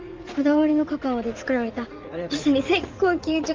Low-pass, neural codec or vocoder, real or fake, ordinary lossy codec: 7.2 kHz; vocoder, 44.1 kHz, 80 mel bands, Vocos; fake; Opus, 24 kbps